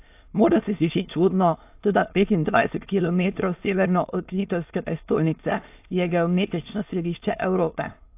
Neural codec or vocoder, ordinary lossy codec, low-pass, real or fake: autoencoder, 22.05 kHz, a latent of 192 numbers a frame, VITS, trained on many speakers; AAC, 32 kbps; 3.6 kHz; fake